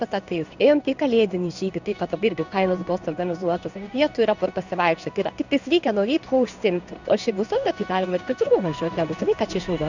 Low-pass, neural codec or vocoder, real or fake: 7.2 kHz; codec, 16 kHz in and 24 kHz out, 1 kbps, XY-Tokenizer; fake